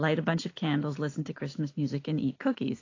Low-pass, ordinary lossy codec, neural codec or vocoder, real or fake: 7.2 kHz; AAC, 32 kbps; none; real